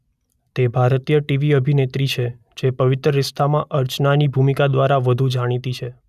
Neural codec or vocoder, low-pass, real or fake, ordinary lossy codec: none; 14.4 kHz; real; none